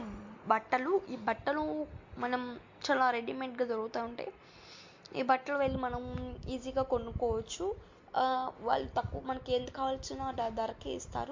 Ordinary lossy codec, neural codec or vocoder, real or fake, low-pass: MP3, 48 kbps; none; real; 7.2 kHz